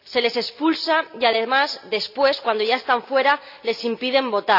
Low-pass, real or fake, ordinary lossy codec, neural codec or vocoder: 5.4 kHz; real; none; none